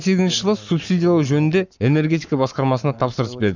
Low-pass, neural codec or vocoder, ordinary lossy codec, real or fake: 7.2 kHz; codec, 44.1 kHz, 7.8 kbps, Pupu-Codec; none; fake